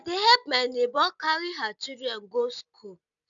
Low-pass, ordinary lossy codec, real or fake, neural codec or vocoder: 7.2 kHz; none; real; none